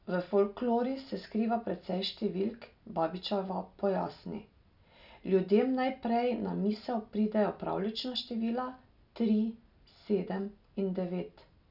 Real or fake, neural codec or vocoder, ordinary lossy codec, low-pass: real; none; none; 5.4 kHz